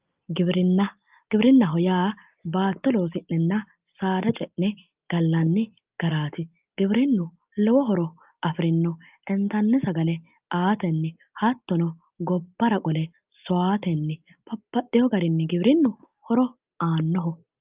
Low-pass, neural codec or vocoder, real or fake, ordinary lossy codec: 3.6 kHz; none; real; Opus, 24 kbps